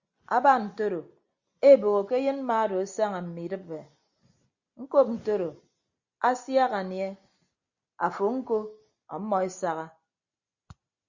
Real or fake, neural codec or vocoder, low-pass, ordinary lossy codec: real; none; 7.2 kHz; Opus, 64 kbps